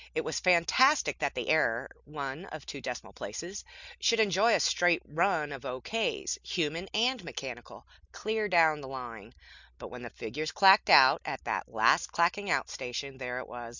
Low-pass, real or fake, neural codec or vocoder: 7.2 kHz; real; none